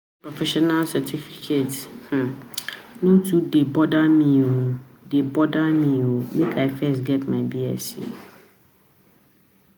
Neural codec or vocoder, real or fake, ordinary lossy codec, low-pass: none; real; none; none